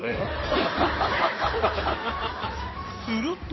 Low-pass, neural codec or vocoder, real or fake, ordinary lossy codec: 7.2 kHz; codec, 16 kHz in and 24 kHz out, 2.2 kbps, FireRedTTS-2 codec; fake; MP3, 24 kbps